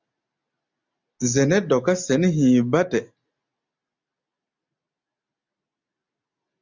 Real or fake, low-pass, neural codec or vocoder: real; 7.2 kHz; none